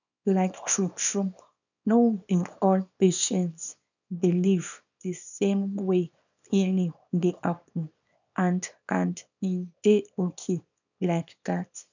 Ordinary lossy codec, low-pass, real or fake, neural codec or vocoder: none; 7.2 kHz; fake; codec, 24 kHz, 0.9 kbps, WavTokenizer, small release